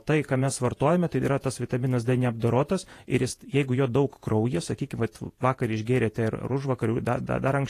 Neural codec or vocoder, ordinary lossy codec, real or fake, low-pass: vocoder, 48 kHz, 128 mel bands, Vocos; AAC, 48 kbps; fake; 14.4 kHz